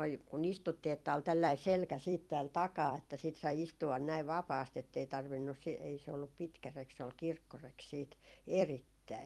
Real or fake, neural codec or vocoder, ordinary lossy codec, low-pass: fake; vocoder, 44.1 kHz, 128 mel bands every 256 samples, BigVGAN v2; Opus, 32 kbps; 19.8 kHz